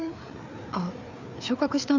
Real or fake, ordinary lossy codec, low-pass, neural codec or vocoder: fake; none; 7.2 kHz; codec, 16 kHz, 4 kbps, FreqCodec, larger model